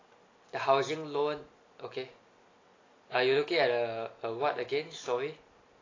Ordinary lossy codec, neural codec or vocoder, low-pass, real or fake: AAC, 32 kbps; none; 7.2 kHz; real